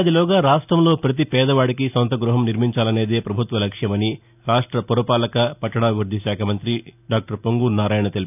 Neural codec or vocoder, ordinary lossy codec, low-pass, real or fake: none; none; 3.6 kHz; real